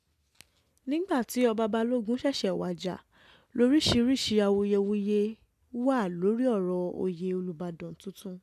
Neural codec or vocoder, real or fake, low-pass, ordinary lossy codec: none; real; 14.4 kHz; AAC, 96 kbps